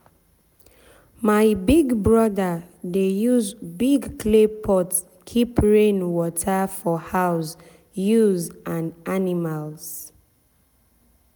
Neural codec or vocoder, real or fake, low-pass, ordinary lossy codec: none; real; none; none